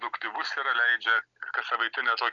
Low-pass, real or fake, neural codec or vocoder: 7.2 kHz; real; none